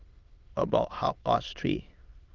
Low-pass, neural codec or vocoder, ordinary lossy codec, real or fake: 7.2 kHz; autoencoder, 22.05 kHz, a latent of 192 numbers a frame, VITS, trained on many speakers; Opus, 32 kbps; fake